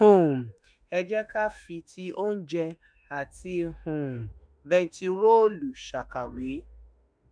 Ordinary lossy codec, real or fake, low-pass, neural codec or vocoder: MP3, 96 kbps; fake; 9.9 kHz; autoencoder, 48 kHz, 32 numbers a frame, DAC-VAE, trained on Japanese speech